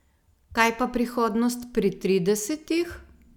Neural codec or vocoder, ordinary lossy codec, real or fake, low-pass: none; none; real; 19.8 kHz